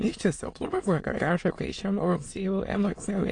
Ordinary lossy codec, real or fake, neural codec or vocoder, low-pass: AAC, 48 kbps; fake; autoencoder, 22.05 kHz, a latent of 192 numbers a frame, VITS, trained on many speakers; 9.9 kHz